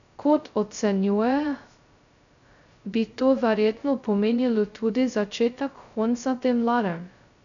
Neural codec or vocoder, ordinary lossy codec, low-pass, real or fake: codec, 16 kHz, 0.2 kbps, FocalCodec; none; 7.2 kHz; fake